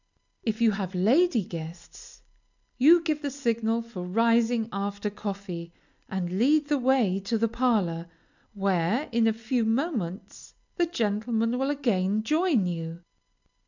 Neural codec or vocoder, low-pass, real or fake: none; 7.2 kHz; real